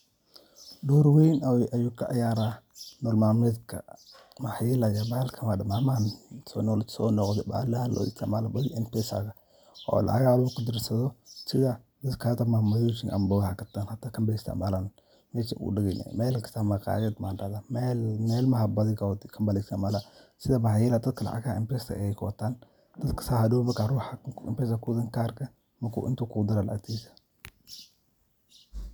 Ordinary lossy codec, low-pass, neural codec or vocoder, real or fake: none; none; none; real